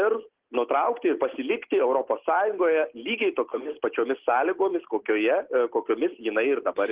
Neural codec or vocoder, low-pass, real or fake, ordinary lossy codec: none; 3.6 kHz; real; Opus, 16 kbps